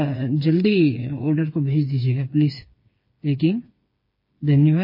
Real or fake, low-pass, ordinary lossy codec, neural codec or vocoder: fake; 5.4 kHz; MP3, 24 kbps; codec, 16 kHz, 4 kbps, FreqCodec, smaller model